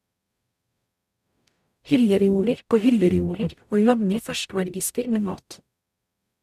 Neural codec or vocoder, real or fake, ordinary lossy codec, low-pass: codec, 44.1 kHz, 0.9 kbps, DAC; fake; none; 14.4 kHz